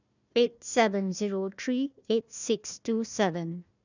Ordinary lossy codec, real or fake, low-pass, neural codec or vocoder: none; fake; 7.2 kHz; codec, 16 kHz, 1 kbps, FunCodec, trained on Chinese and English, 50 frames a second